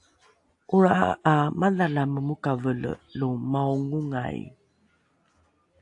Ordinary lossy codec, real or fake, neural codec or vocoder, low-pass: AAC, 64 kbps; real; none; 10.8 kHz